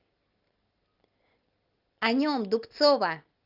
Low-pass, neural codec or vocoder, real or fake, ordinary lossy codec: 5.4 kHz; none; real; Opus, 32 kbps